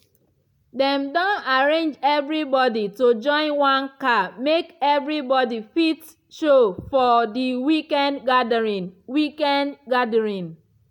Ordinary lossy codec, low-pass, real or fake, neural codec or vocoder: MP3, 96 kbps; 19.8 kHz; real; none